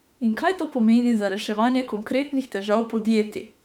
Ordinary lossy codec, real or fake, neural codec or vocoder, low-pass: none; fake; autoencoder, 48 kHz, 32 numbers a frame, DAC-VAE, trained on Japanese speech; 19.8 kHz